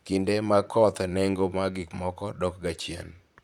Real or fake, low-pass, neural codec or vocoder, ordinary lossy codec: fake; 19.8 kHz; vocoder, 44.1 kHz, 128 mel bands, Pupu-Vocoder; none